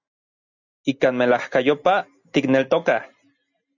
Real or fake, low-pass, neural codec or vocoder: real; 7.2 kHz; none